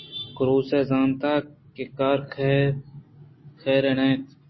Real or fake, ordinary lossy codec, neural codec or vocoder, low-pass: real; MP3, 24 kbps; none; 7.2 kHz